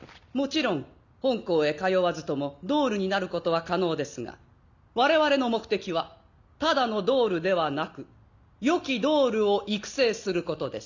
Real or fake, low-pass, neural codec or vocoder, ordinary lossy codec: real; 7.2 kHz; none; none